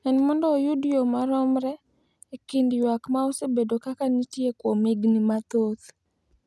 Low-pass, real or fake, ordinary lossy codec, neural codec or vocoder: none; real; none; none